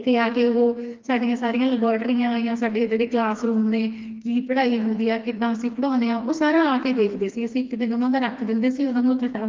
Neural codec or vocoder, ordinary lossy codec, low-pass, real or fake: codec, 16 kHz, 2 kbps, FreqCodec, smaller model; Opus, 32 kbps; 7.2 kHz; fake